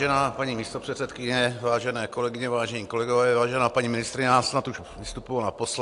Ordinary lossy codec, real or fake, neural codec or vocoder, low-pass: AAC, 64 kbps; real; none; 10.8 kHz